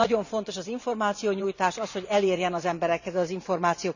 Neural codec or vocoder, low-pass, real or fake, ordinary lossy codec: vocoder, 44.1 kHz, 128 mel bands every 512 samples, BigVGAN v2; 7.2 kHz; fake; none